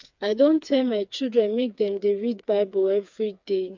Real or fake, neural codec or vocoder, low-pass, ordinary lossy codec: fake; codec, 16 kHz, 4 kbps, FreqCodec, smaller model; 7.2 kHz; MP3, 64 kbps